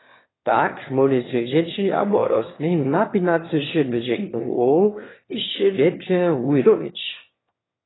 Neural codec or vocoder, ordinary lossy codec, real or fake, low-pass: autoencoder, 22.05 kHz, a latent of 192 numbers a frame, VITS, trained on one speaker; AAC, 16 kbps; fake; 7.2 kHz